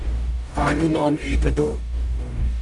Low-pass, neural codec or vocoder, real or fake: 10.8 kHz; codec, 44.1 kHz, 0.9 kbps, DAC; fake